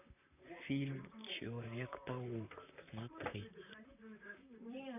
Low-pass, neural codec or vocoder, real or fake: 3.6 kHz; vocoder, 44.1 kHz, 128 mel bands, Pupu-Vocoder; fake